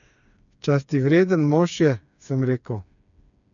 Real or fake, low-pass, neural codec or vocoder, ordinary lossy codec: fake; 7.2 kHz; codec, 16 kHz, 4 kbps, FreqCodec, smaller model; none